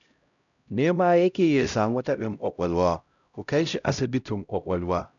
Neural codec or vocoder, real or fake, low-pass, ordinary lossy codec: codec, 16 kHz, 0.5 kbps, X-Codec, HuBERT features, trained on LibriSpeech; fake; 7.2 kHz; none